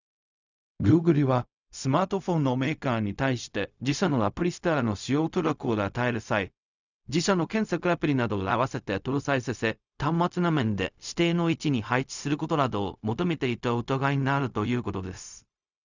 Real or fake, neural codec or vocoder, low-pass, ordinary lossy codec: fake; codec, 16 kHz, 0.4 kbps, LongCat-Audio-Codec; 7.2 kHz; none